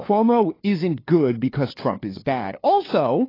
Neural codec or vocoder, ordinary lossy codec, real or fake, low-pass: codec, 16 kHz, 2 kbps, FunCodec, trained on LibriTTS, 25 frames a second; AAC, 24 kbps; fake; 5.4 kHz